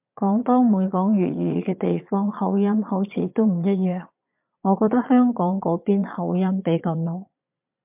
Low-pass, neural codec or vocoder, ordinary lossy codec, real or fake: 3.6 kHz; vocoder, 22.05 kHz, 80 mel bands, Vocos; MP3, 32 kbps; fake